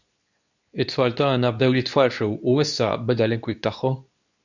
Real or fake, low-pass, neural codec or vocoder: fake; 7.2 kHz; codec, 24 kHz, 0.9 kbps, WavTokenizer, medium speech release version 2